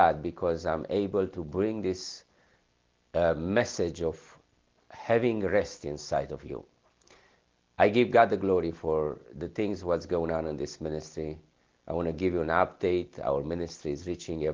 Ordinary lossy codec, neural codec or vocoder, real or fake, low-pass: Opus, 16 kbps; none; real; 7.2 kHz